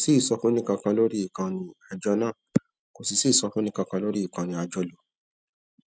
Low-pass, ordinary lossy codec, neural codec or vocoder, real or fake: none; none; none; real